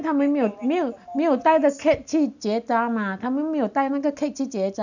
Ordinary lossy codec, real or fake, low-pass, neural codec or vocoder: none; real; 7.2 kHz; none